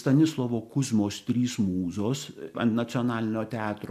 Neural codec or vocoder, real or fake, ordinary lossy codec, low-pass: none; real; MP3, 96 kbps; 14.4 kHz